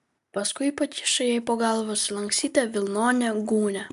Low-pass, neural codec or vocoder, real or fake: 14.4 kHz; none; real